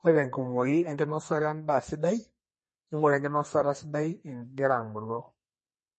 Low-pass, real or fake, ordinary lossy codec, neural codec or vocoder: 10.8 kHz; fake; MP3, 32 kbps; codec, 32 kHz, 1.9 kbps, SNAC